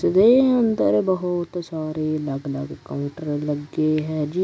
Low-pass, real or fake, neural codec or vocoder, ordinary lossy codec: none; real; none; none